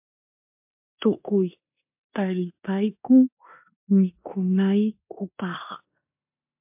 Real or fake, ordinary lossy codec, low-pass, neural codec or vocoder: fake; MP3, 32 kbps; 3.6 kHz; codec, 16 kHz in and 24 kHz out, 0.9 kbps, LongCat-Audio-Codec, four codebook decoder